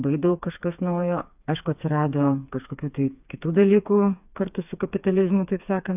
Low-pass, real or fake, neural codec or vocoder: 3.6 kHz; fake; codec, 16 kHz, 4 kbps, FreqCodec, smaller model